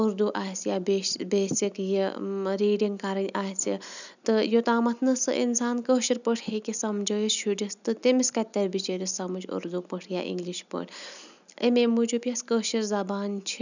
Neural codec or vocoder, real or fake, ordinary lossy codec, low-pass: none; real; none; 7.2 kHz